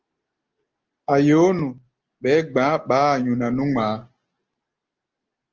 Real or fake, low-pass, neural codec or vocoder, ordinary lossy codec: real; 7.2 kHz; none; Opus, 16 kbps